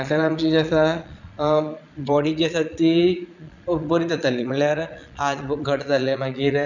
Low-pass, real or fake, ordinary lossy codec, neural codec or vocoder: 7.2 kHz; fake; none; vocoder, 22.05 kHz, 80 mel bands, Vocos